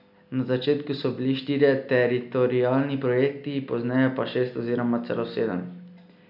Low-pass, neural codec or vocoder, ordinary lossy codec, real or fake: 5.4 kHz; none; none; real